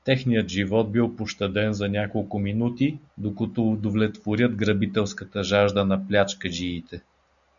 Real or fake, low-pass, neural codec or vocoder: real; 7.2 kHz; none